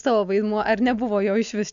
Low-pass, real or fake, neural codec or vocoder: 7.2 kHz; real; none